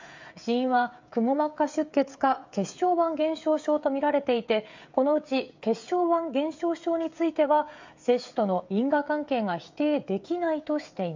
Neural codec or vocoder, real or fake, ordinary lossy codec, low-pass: codec, 16 kHz, 16 kbps, FreqCodec, smaller model; fake; MP3, 64 kbps; 7.2 kHz